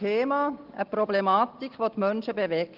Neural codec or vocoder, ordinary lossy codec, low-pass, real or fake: none; Opus, 16 kbps; 5.4 kHz; real